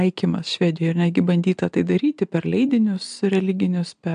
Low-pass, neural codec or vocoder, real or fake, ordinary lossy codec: 9.9 kHz; none; real; AAC, 96 kbps